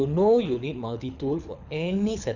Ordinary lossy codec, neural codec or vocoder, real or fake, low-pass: none; codec, 24 kHz, 6 kbps, HILCodec; fake; 7.2 kHz